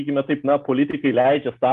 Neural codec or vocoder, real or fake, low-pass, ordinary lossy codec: none; real; 10.8 kHz; Opus, 32 kbps